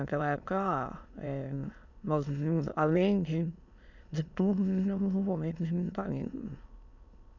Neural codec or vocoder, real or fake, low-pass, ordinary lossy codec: autoencoder, 22.05 kHz, a latent of 192 numbers a frame, VITS, trained on many speakers; fake; 7.2 kHz; none